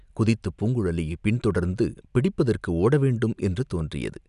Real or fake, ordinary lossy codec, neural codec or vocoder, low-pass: real; none; none; 10.8 kHz